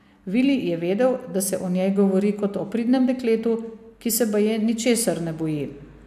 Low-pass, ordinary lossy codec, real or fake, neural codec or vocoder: 14.4 kHz; AAC, 96 kbps; real; none